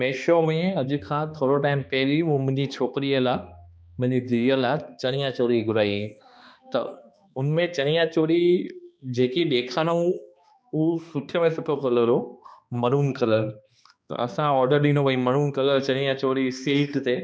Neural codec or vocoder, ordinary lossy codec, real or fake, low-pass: codec, 16 kHz, 2 kbps, X-Codec, HuBERT features, trained on balanced general audio; none; fake; none